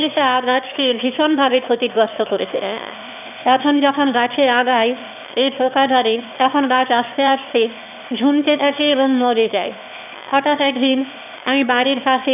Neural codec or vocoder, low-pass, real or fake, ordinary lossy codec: autoencoder, 22.05 kHz, a latent of 192 numbers a frame, VITS, trained on one speaker; 3.6 kHz; fake; none